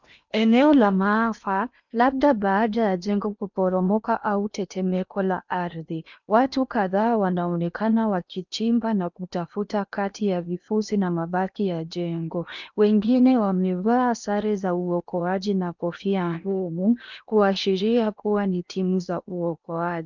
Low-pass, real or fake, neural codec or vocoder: 7.2 kHz; fake; codec, 16 kHz in and 24 kHz out, 0.8 kbps, FocalCodec, streaming, 65536 codes